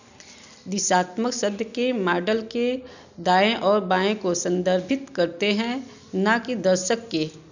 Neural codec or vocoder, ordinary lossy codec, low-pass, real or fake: none; none; 7.2 kHz; real